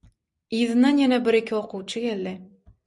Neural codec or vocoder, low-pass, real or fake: vocoder, 44.1 kHz, 128 mel bands every 256 samples, BigVGAN v2; 10.8 kHz; fake